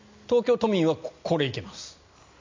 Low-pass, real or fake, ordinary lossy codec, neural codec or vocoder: 7.2 kHz; real; MP3, 64 kbps; none